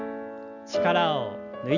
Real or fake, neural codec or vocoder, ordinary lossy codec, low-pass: real; none; none; 7.2 kHz